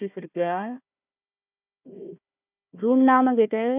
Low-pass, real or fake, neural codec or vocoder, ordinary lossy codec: 3.6 kHz; fake; codec, 16 kHz, 1 kbps, FunCodec, trained on Chinese and English, 50 frames a second; none